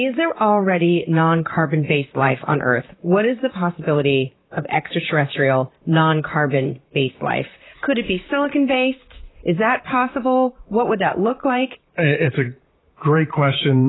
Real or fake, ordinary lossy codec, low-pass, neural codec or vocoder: fake; AAC, 16 kbps; 7.2 kHz; vocoder, 44.1 kHz, 128 mel bands, Pupu-Vocoder